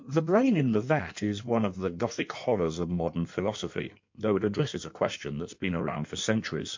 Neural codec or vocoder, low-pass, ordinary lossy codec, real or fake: codec, 16 kHz in and 24 kHz out, 1.1 kbps, FireRedTTS-2 codec; 7.2 kHz; MP3, 48 kbps; fake